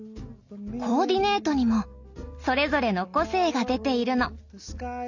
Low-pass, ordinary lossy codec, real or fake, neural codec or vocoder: 7.2 kHz; none; real; none